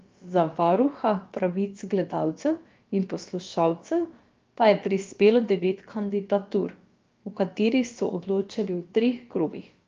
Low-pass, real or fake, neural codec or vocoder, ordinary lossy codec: 7.2 kHz; fake; codec, 16 kHz, about 1 kbps, DyCAST, with the encoder's durations; Opus, 24 kbps